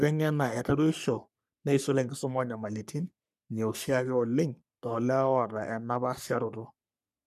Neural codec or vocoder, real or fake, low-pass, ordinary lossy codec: codec, 44.1 kHz, 3.4 kbps, Pupu-Codec; fake; 14.4 kHz; none